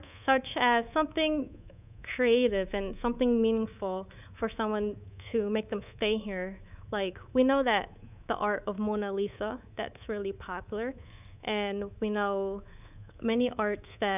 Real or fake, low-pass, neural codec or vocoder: fake; 3.6 kHz; codec, 24 kHz, 3.1 kbps, DualCodec